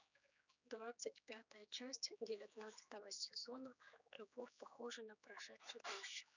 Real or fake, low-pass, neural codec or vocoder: fake; 7.2 kHz; codec, 16 kHz, 4 kbps, X-Codec, HuBERT features, trained on general audio